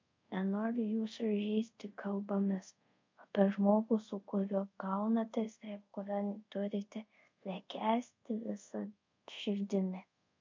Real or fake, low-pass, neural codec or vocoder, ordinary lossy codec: fake; 7.2 kHz; codec, 24 kHz, 0.5 kbps, DualCodec; MP3, 48 kbps